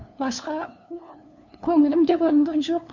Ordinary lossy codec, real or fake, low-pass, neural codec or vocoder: none; fake; 7.2 kHz; codec, 16 kHz, 2 kbps, FunCodec, trained on LibriTTS, 25 frames a second